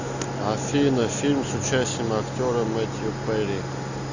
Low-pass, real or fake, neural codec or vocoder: 7.2 kHz; real; none